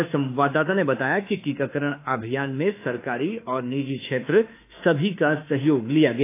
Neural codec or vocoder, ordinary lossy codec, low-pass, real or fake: autoencoder, 48 kHz, 32 numbers a frame, DAC-VAE, trained on Japanese speech; AAC, 24 kbps; 3.6 kHz; fake